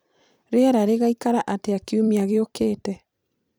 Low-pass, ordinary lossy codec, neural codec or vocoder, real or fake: none; none; vocoder, 44.1 kHz, 128 mel bands every 256 samples, BigVGAN v2; fake